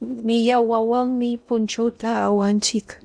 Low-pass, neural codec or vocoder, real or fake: 9.9 kHz; codec, 16 kHz in and 24 kHz out, 0.6 kbps, FocalCodec, streaming, 2048 codes; fake